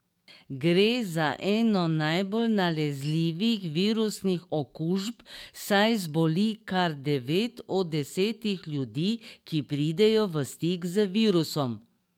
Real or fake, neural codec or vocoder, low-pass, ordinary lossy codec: fake; codec, 44.1 kHz, 7.8 kbps, DAC; 19.8 kHz; MP3, 96 kbps